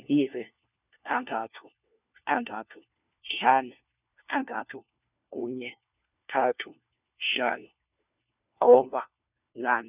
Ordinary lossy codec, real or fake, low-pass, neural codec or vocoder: none; fake; 3.6 kHz; codec, 16 kHz, 1 kbps, FunCodec, trained on LibriTTS, 50 frames a second